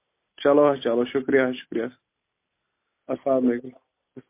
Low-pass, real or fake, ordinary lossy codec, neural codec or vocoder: 3.6 kHz; real; MP3, 24 kbps; none